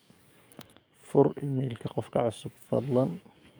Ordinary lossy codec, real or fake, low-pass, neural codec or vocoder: none; fake; none; codec, 44.1 kHz, 7.8 kbps, DAC